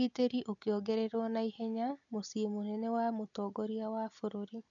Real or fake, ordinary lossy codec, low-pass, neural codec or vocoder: real; none; 7.2 kHz; none